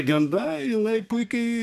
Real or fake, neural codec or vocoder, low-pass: fake; codec, 32 kHz, 1.9 kbps, SNAC; 14.4 kHz